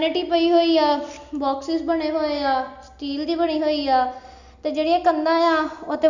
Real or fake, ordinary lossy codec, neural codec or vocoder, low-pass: real; none; none; 7.2 kHz